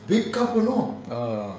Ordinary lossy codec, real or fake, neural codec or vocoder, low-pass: none; fake; codec, 16 kHz, 16 kbps, FreqCodec, smaller model; none